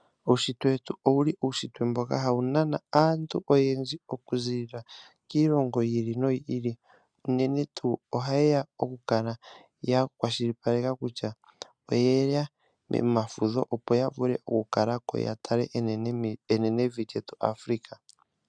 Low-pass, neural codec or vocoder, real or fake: 9.9 kHz; none; real